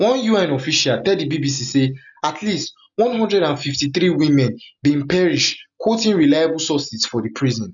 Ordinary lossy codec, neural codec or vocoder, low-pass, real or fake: none; none; 7.2 kHz; real